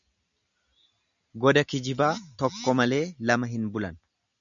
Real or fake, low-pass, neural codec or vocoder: real; 7.2 kHz; none